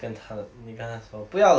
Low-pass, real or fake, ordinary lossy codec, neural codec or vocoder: none; real; none; none